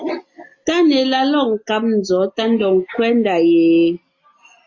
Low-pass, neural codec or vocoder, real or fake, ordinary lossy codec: 7.2 kHz; none; real; AAC, 48 kbps